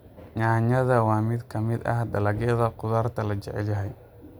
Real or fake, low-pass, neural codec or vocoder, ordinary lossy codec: real; none; none; none